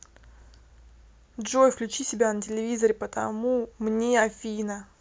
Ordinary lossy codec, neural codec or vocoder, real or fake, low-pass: none; none; real; none